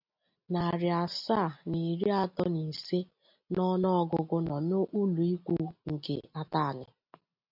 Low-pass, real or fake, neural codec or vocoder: 5.4 kHz; real; none